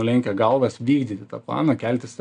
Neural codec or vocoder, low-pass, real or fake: none; 9.9 kHz; real